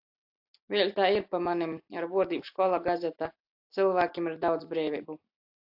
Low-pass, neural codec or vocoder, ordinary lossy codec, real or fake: 5.4 kHz; none; AAC, 48 kbps; real